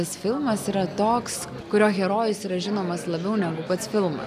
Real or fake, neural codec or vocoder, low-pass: real; none; 14.4 kHz